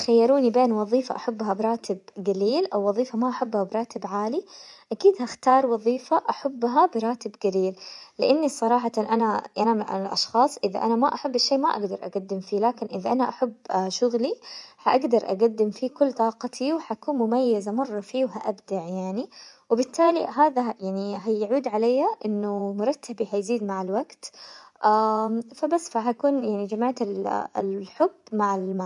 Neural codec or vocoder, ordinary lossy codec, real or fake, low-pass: vocoder, 24 kHz, 100 mel bands, Vocos; none; fake; 10.8 kHz